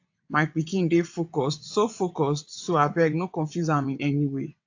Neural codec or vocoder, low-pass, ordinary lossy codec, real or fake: vocoder, 22.05 kHz, 80 mel bands, WaveNeXt; 7.2 kHz; AAC, 48 kbps; fake